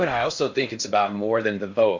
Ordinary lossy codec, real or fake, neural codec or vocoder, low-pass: MP3, 64 kbps; fake; codec, 16 kHz in and 24 kHz out, 0.6 kbps, FocalCodec, streaming, 4096 codes; 7.2 kHz